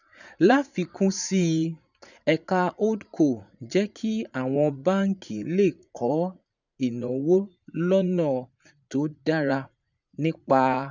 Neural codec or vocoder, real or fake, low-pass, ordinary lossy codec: vocoder, 44.1 kHz, 80 mel bands, Vocos; fake; 7.2 kHz; none